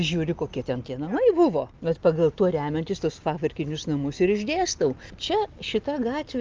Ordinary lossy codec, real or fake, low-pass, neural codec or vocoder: Opus, 24 kbps; real; 7.2 kHz; none